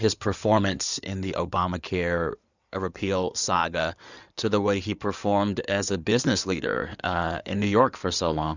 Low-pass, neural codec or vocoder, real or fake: 7.2 kHz; codec, 16 kHz in and 24 kHz out, 2.2 kbps, FireRedTTS-2 codec; fake